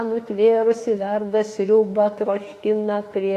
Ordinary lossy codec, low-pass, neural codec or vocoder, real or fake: AAC, 48 kbps; 14.4 kHz; autoencoder, 48 kHz, 32 numbers a frame, DAC-VAE, trained on Japanese speech; fake